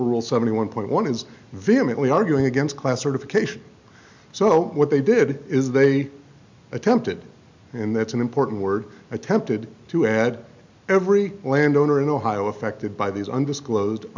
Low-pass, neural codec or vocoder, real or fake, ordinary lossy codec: 7.2 kHz; none; real; MP3, 64 kbps